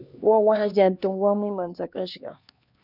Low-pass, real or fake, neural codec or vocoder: 5.4 kHz; fake; codec, 16 kHz, 2 kbps, X-Codec, HuBERT features, trained on LibriSpeech